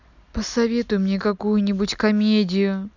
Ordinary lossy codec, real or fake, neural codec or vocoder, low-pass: none; real; none; 7.2 kHz